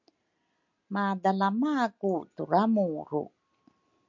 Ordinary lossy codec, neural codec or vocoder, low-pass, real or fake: MP3, 64 kbps; none; 7.2 kHz; real